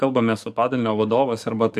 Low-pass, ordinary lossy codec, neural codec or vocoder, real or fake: 14.4 kHz; MP3, 96 kbps; codec, 44.1 kHz, 7.8 kbps, DAC; fake